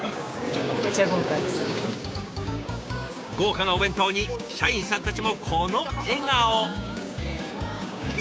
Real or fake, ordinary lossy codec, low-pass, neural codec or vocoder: fake; none; none; codec, 16 kHz, 6 kbps, DAC